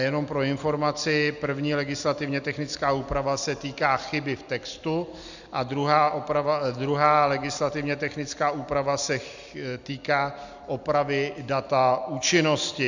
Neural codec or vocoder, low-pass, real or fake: none; 7.2 kHz; real